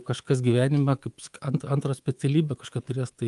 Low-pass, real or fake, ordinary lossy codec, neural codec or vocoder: 10.8 kHz; fake; Opus, 32 kbps; codec, 24 kHz, 3.1 kbps, DualCodec